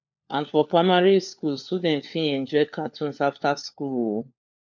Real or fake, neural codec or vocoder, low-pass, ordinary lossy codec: fake; codec, 16 kHz, 4 kbps, FunCodec, trained on LibriTTS, 50 frames a second; 7.2 kHz; none